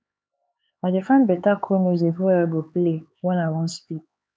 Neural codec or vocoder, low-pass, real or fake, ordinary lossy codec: codec, 16 kHz, 4 kbps, X-Codec, HuBERT features, trained on LibriSpeech; none; fake; none